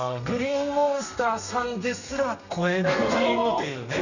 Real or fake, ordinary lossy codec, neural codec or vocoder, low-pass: fake; none; codec, 32 kHz, 1.9 kbps, SNAC; 7.2 kHz